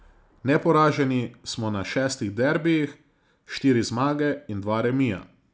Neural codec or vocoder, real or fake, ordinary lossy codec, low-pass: none; real; none; none